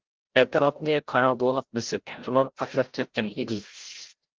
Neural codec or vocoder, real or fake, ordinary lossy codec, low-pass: codec, 16 kHz, 0.5 kbps, FreqCodec, larger model; fake; Opus, 16 kbps; 7.2 kHz